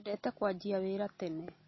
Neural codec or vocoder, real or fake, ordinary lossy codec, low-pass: none; real; MP3, 24 kbps; 7.2 kHz